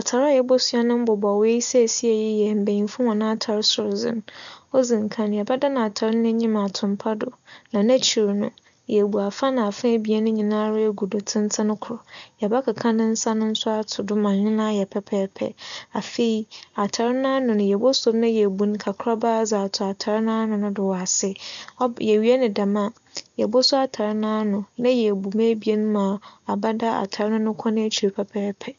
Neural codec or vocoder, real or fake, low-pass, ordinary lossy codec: none; real; 7.2 kHz; none